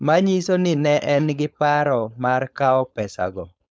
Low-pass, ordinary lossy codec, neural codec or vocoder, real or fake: none; none; codec, 16 kHz, 4.8 kbps, FACodec; fake